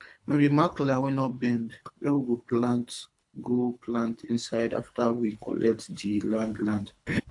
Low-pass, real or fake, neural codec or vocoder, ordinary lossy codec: 10.8 kHz; fake; codec, 24 kHz, 3 kbps, HILCodec; AAC, 64 kbps